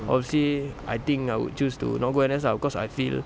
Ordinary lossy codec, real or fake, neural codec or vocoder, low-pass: none; real; none; none